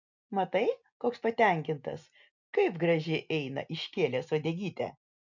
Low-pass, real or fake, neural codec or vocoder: 7.2 kHz; real; none